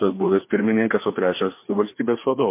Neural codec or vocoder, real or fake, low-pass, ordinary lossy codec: codec, 16 kHz, 2 kbps, FreqCodec, larger model; fake; 3.6 kHz; MP3, 24 kbps